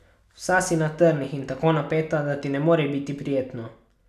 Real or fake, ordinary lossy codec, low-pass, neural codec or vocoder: real; none; 14.4 kHz; none